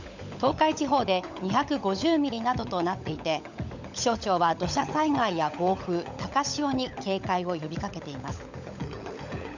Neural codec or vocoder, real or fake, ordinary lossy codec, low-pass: codec, 16 kHz, 16 kbps, FunCodec, trained on LibriTTS, 50 frames a second; fake; none; 7.2 kHz